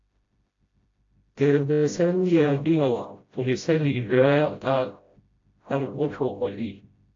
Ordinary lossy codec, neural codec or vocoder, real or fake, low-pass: AAC, 48 kbps; codec, 16 kHz, 0.5 kbps, FreqCodec, smaller model; fake; 7.2 kHz